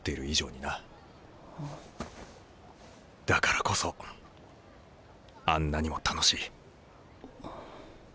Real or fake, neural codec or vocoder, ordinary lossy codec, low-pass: real; none; none; none